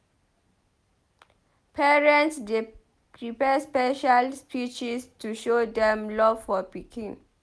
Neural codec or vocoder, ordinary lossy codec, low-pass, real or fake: none; none; none; real